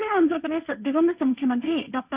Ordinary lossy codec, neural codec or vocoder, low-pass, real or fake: Opus, 64 kbps; codec, 16 kHz, 1.1 kbps, Voila-Tokenizer; 3.6 kHz; fake